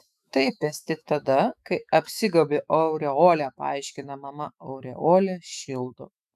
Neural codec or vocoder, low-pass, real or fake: autoencoder, 48 kHz, 128 numbers a frame, DAC-VAE, trained on Japanese speech; 14.4 kHz; fake